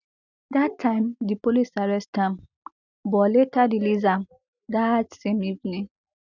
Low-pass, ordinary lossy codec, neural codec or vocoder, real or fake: 7.2 kHz; none; none; real